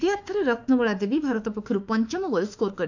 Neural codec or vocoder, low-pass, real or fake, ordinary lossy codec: autoencoder, 48 kHz, 32 numbers a frame, DAC-VAE, trained on Japanese speech; 7.2 kHz; fake; none